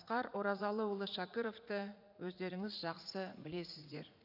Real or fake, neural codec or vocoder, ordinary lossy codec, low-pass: fake; vocoder, 44.1 kHz, 80 mel bands, Vocos; none; 5.4 kHz